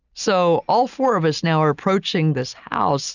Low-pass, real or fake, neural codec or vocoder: 7.2 kHz; real; none